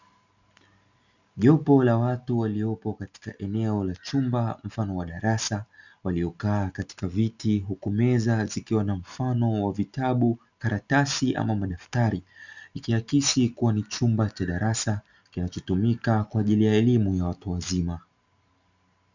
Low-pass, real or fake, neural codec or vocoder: 7.2 kHz; real; none